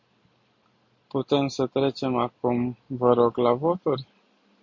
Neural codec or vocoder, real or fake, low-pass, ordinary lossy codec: none; real; 7.2 kHz; MP3, 48 kbps